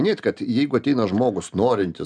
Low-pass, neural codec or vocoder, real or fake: 9.9 kHz; none; real